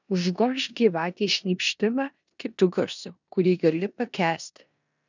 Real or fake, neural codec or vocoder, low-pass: fake; codec, 16 kHz in and 24 kHz out, 0.9 kbps, LongCat-Audio-Codec, four codebook decoder; 7.2 kHz